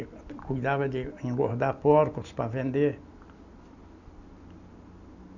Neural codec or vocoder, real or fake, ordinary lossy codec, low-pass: none; real; none; 7.2 kHz